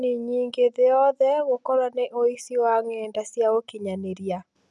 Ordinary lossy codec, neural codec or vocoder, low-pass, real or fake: none; none; none; real